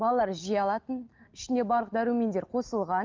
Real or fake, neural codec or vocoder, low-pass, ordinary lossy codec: real; none; 7.2 kHz; Opus, 32 kbps